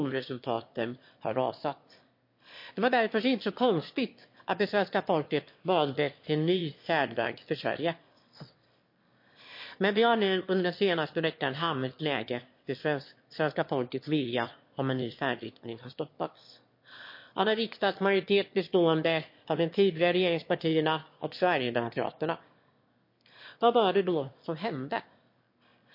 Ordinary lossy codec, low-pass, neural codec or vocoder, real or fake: MP3, 32 kbps; 5.4 kHz; autoencoder, 22.05 kHz, a latent of 192 numbers a frame, VITS, trained on one speaker; fake